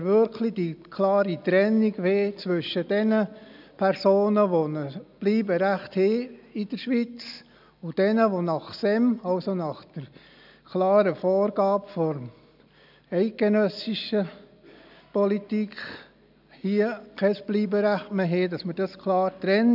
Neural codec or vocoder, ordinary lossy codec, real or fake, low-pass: none; none; real; 5.4 kHz